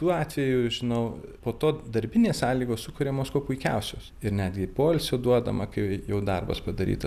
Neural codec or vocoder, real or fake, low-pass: none; real; 14.4 kHz